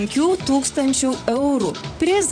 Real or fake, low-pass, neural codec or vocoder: fake; 9.9 kHz; vocoder, 44.1 kHz, 128 mel bands, Pupu-Vocoder